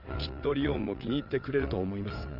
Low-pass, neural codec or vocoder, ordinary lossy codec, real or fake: 5.4 kHz; vocoder, 22.05 kHz, 80 mel bands, WaveNeXt; none; fake